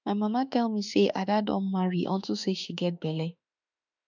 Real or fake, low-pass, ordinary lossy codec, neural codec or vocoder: fake; 7.2 kHz; none; autoencoder, 48 kHz, 32 numbers a frame, DAC-VAE, trained on Japanese speech